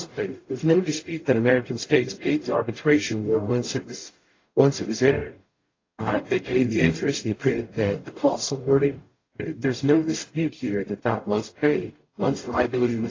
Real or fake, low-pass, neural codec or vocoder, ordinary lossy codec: fake; 7.2 kHz; codec, 44.1 kHz, 0.9 kbps, DAC; AAC, 32 kbps